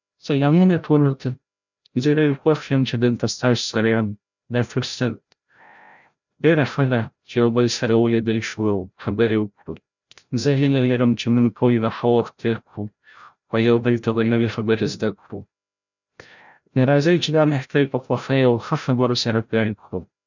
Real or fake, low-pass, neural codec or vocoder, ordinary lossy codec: fake; 7.2 kHz; codec, 16 kHz, 0.5 kbps, FreqCodec, larger model; none